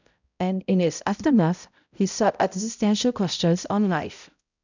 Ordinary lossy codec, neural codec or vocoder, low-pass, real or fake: none; codec, 16 kHz, 0.5 kbps, X-Codec, HuBERT features, trained on balanced general audio; 7.2 kHz; fake